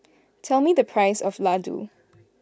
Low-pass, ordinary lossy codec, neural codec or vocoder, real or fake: none; none; none; real